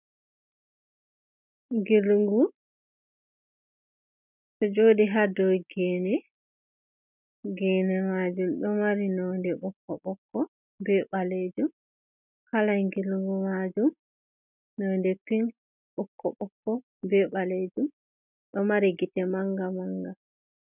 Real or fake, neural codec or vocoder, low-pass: real; none; 3.6 kHz